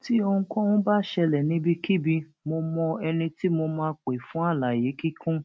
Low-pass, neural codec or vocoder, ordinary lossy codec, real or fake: none; none; none; real